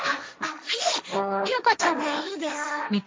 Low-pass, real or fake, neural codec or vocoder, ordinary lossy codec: none; fake; codec, 16 kHz, 1.1 kbps, Voila-Tokenizer; none